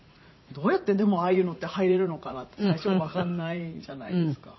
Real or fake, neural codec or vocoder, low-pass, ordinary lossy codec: fake; vocoder, 44.1 kHz, 80 mel bands, Vocos; 7.2 kHz; MP3, 24 kbps